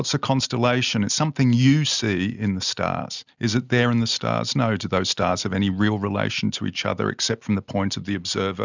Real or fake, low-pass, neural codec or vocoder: real; 7.2 kHz; none